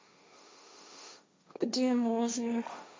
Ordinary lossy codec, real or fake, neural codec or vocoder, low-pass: none; fake; codec, 16 kHz, 1.1 kbps, Voila-Tokenizer; none